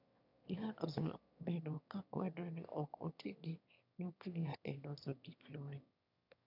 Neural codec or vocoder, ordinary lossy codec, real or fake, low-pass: autoencoder, 22.05 kHz, a latent of 192 numbers a frame, VITS, trained on one speaker; none; fake; 5.4 kHz